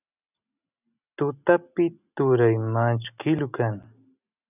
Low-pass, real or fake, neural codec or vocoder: 3.6 kHz; real; none